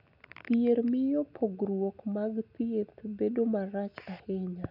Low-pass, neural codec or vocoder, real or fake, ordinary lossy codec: 5.4 kHz; none; real; none